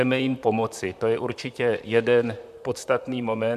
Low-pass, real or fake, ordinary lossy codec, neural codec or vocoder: 14.4 kHz; fake; MP3, 96 kbps; vocoder, 44.1 kHz, 128 mel bands, Pupu-Vocoder